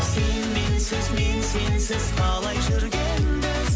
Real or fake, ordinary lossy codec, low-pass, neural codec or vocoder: real; none; none; none